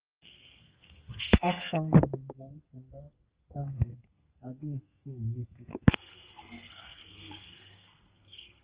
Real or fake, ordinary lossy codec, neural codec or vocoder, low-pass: fake; Opus, 24 kbps; codec, 32 kHz, 1.9 kbps, SNAC; 3.6 kHz